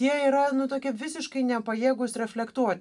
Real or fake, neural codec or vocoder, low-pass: real; none; 10.8 kHz